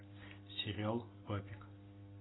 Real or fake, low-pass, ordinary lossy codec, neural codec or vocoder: real; 7.2 kHz; AAC, 16 kbps; none